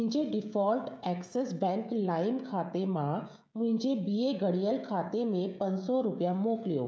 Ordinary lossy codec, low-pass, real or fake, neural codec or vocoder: none; none; fake; codec, 16 kHz, 16 kbps, FreqCodec, smaller model